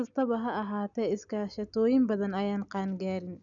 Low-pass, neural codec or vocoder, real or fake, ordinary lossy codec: 7.2 kHz; none; real; none